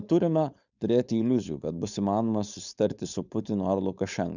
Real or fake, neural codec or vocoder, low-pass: fake; codec, 16 kHz, 4.8 kbps, FACodec; 7.2 kHz